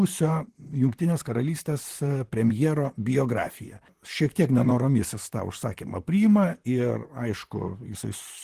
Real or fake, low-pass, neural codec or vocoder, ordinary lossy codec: fake; 14.4 kHz; vocoder, 44.1 kHz, 128 mel bands, Pupu-Vocoder; Opus, 16 kbps